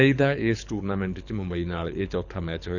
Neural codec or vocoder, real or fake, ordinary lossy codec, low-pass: codec, 24 kHz, 6 kbps, HILCodec; fake; none; 7.2 kHz